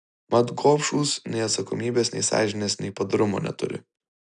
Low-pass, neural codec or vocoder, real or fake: 9.9 kHz; none; real